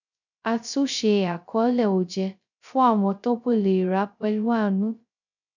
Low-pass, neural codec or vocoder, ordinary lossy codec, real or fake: 7.2 kHz; codec, 16 kHz, 0.2 kbps, FocalCodec; none; fake